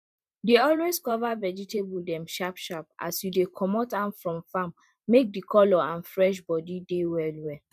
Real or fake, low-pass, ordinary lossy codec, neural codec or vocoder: fake; 14.4 kHz; MP3, 96 kbps; vocoder, 44.1 kHz, 128 mel bands every 512 samples, BigVGAN v2